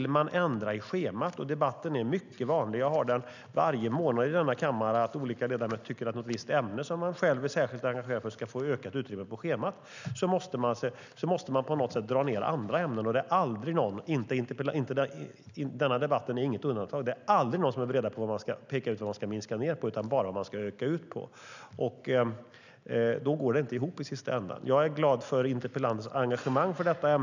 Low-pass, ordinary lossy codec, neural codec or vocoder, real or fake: 7.2 kHz; none; none; real